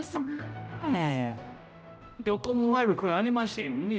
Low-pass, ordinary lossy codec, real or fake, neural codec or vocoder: none; none; fake; codec, 16 kHz, 0.5 kbps, X-Codec, HuBERT features, trained on general audio